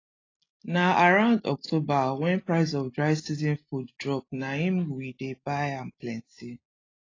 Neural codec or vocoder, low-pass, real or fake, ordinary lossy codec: none; 7.2 kHz; real; AAC, 32 kbps